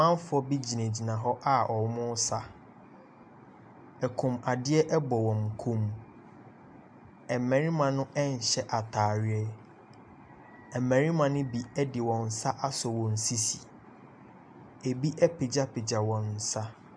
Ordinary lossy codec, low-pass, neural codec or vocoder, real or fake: MP3, 96 kbps; 9.9 kHz; none; real